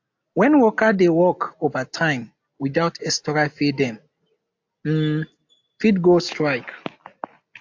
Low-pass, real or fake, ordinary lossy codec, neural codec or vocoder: 7.2 kHz; real; AAC, 48 kbps; none